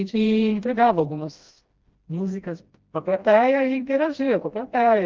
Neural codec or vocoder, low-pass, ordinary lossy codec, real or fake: codec, 16 kHz, 1 kbps, FreqCodec, smaller model; 7.2 kHz; Opus, 24 kbps; fake